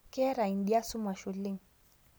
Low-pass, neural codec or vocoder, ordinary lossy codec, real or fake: none; none; none; real